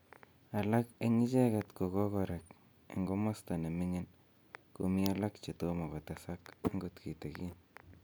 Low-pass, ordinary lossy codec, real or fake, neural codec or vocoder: none; none; real; none